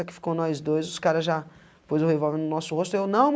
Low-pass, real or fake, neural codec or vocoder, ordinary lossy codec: none; real; none; none